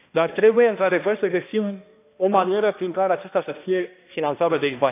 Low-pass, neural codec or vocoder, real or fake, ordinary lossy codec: 3.6 kHz; codec, 16 kHz, 1 kbps, X-Codec, HuBERT features, trained on balanced general audio; fake; AAC, 24 kbps